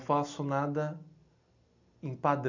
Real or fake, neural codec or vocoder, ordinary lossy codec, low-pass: real; none; none; 7.2 kHz